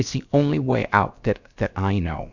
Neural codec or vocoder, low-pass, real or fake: codec, 16 kHz, about 1 kbps, DyCAST, with the encoder's durations; 7.2 kHz; fake